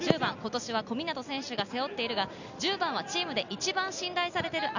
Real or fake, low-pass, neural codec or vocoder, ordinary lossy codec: real; 7.2 kHz; none; none